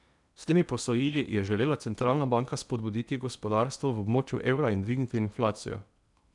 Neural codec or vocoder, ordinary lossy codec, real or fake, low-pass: codec, 16 kHz in and 24 kHz out, 0.8 kbps, FocalCodec, streaming, 65536 codes; none; fake; 10.8 kHz